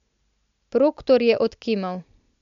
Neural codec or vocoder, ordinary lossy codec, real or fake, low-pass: none; MP3, 64 kbps; real; 7.2 kHz